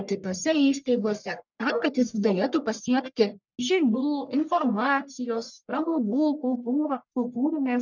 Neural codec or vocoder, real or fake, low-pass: codec, 44.1 kHz, 1.7 kbps, Pupu-Codec; fake; 7.2 kHz